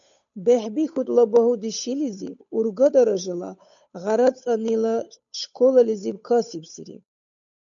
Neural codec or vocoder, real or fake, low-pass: codec, 16 kHz, 8 kbps, FunCodec, trained on Chinese and English, 25 frames a second; fake; 7.2 kHz